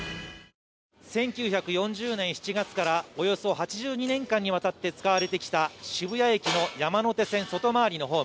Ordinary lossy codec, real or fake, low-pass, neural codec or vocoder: none; real; none; none